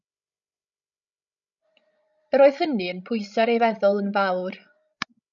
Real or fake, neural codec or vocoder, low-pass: fake; codec, 16 kHz, 16 kbps, FreqCodec, larger model; 7.2 kHz